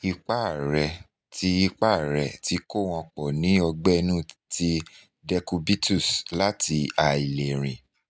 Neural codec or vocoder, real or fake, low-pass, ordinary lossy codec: none; real; none; none